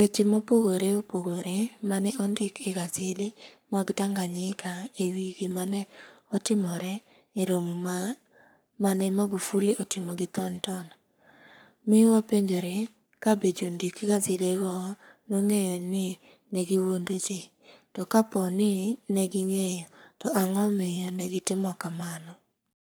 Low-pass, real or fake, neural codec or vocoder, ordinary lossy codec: none; fake; codec, 44.1 kHz, 3.4 kbps, Pupu-Codec; none